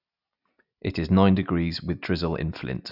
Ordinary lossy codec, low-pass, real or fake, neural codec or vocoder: none; 5.4 kHz; real; none